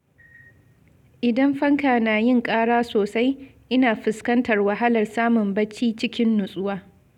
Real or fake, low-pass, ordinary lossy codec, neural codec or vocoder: real; 19.8 kHz; none; none